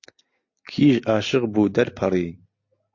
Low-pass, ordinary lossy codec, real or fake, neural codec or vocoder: 7.2 kHz; MP3, 48 kbps; real; none